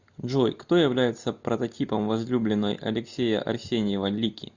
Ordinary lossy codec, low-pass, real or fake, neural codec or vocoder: Opus, 64 kbps; 7.2 kHz; real; none